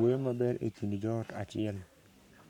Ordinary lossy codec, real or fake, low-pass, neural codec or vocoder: none; fake; 19.8 kHz; codec, 44.1 kHz, 7.8 kbps, Pupu-Codec